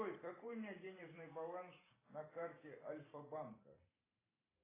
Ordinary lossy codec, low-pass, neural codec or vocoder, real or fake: AAC, 16 kbps; 3.6 kHz; vocoder, 44.1 kHz, 128 mel bands every 512 samples, BigVGAN v2; fake